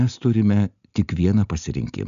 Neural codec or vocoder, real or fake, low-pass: none; real; 7.2 kHz